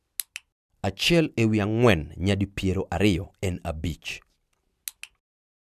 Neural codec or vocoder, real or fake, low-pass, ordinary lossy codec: none; real; 14.4 kHz; none